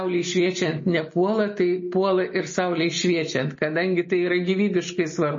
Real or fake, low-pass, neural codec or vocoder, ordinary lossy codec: real; 7.2 kHz; none; MP3, 32 kbps